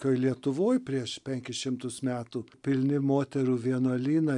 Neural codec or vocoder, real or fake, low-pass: none; real; 10.8 kHz